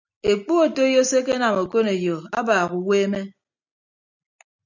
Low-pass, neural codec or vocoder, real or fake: 7.2 kHz; none; real